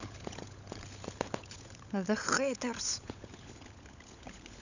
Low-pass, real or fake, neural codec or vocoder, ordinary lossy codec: 7.2 kHz; fake; codec, 16 kHz, 16 kbps, FunCodec, trained on LibriTTS, 50 frames a second; none